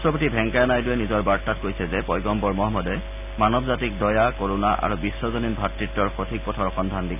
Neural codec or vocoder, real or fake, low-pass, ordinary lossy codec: none; real; 3.6 kHz; none